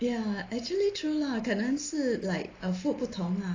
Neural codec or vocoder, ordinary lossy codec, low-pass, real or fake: none; AAC, 32 kbps; 7.2 kHz; real